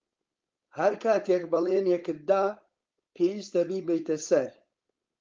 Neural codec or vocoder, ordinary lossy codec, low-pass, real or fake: codec, 16 kHz, 4.8 kbps, FACodec; Opus, 24 kbps; 7.2 kHz; fake